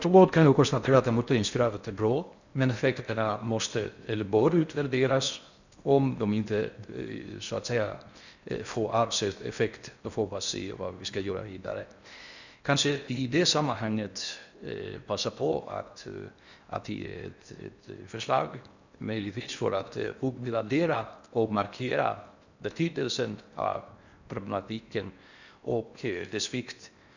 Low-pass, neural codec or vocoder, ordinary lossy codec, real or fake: 7.2 kHz; codec, 16 kHz in and 24 kHz out, 0.6 kbps, FocalCodec, streaming, 4096 codes; none; fake